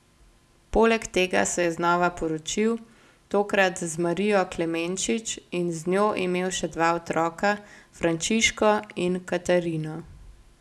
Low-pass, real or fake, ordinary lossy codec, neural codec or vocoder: none; real; none; none